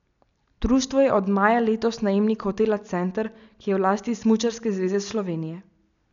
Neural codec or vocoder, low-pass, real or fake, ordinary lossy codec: none; 7.2 kHz; real; none